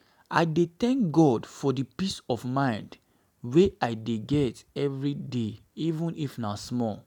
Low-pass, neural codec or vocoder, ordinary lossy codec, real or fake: 19.8 kHz; none; none; real